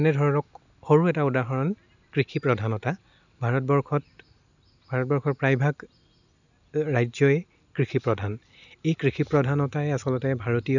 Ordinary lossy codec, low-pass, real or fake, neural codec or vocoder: none; 7.2 kHz; real; none